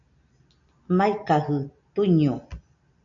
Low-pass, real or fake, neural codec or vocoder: 7.2 kHz; real; none